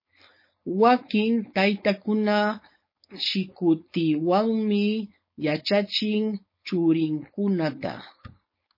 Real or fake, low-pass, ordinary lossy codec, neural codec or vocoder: fake; 5.4 kHz; MP3, 24 kbps; codec, 16 kHz, 4.8 kbps, FACodec